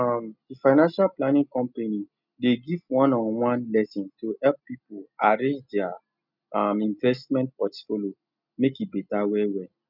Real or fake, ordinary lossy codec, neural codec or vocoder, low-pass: real; none; none; 5.4 kHz